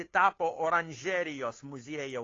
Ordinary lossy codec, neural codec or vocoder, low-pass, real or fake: AAC, 32 kbps; codec, 16 kHz, 2 kbps, FunCodec, trained on Chinese and English, 25 frames a second; 7.2 kHz; fake